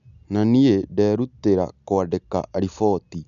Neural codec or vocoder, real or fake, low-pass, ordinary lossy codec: none; real; 7.2 kHz; none